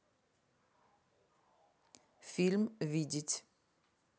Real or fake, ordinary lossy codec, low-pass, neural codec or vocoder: real; none; none; none